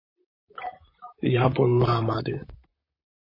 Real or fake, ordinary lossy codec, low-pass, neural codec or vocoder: fake; MP3, 24 kbps; 5.4 kHz; vocoder, 44.1 kHz, 128 mel bands, Pupu-Vocoder